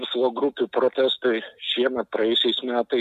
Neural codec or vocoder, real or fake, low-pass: none; real; 14.4 kHz